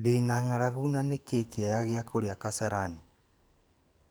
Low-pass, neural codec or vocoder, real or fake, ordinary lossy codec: none; codec, 44.1 kHz, 2.6 kbps, SNAC; fake; none